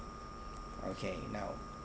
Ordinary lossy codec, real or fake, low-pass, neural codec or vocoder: none; real; none; none